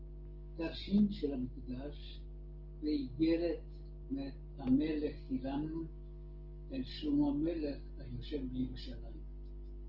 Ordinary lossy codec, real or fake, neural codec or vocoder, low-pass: Opus, 16 kbps; real; none; 5.4 kHz